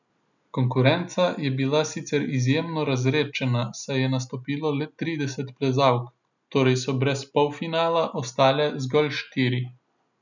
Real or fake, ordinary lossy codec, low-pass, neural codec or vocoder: real; none; 7.2 kHz; none